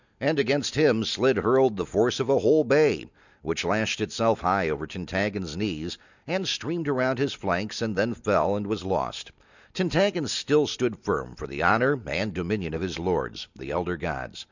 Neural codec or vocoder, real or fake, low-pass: none; real; 7.2 kHz